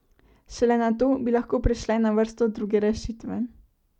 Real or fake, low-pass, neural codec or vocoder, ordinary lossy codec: real; 19.8 kHz; none; none